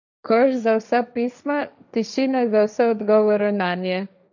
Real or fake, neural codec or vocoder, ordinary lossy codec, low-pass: fake; codec, 16 kHz, 1.1 kbps, Voila-Tokenizer; none; 7.2 kHz